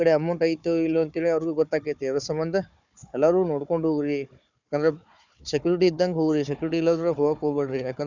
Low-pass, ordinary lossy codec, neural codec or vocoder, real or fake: 7.2 kHz; none; codec, 16 kHz, 4 kbps, FunCodec, trained on Chinese and English, 50 frames a second; fake